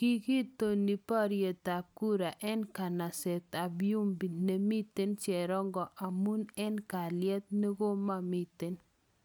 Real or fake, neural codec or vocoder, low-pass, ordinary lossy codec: real; none; none; none